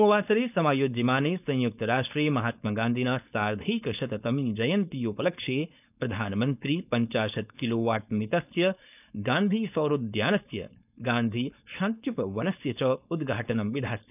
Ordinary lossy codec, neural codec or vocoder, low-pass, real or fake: none; codec, 16 kHz, 4.8 kbps, FACodec; 3.6 kHz; fake